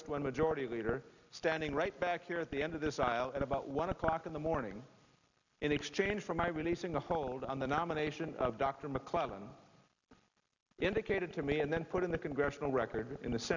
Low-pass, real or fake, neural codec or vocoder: 7.2 kHz; real; none